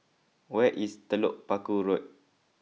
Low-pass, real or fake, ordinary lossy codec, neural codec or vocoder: none; real; none; none